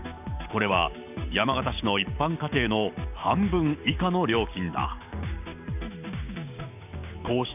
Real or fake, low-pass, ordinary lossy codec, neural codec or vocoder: fake; 3.6 kHz; none; codec, 16 kHz, 6 kbps, DAC